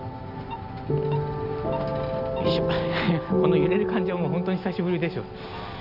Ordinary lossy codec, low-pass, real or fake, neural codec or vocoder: none; 5.4 kHz; real; none